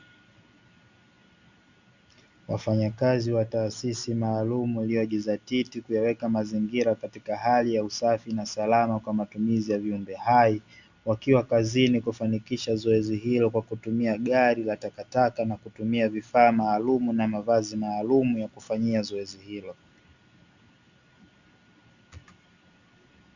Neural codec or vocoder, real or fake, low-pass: none; real; 7.2 kHz